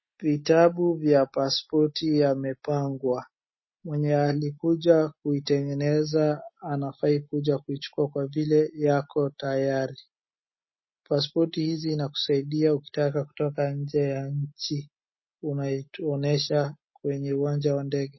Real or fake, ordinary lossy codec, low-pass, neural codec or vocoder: real; MP3, 24 kbps; 7.2 kHz; none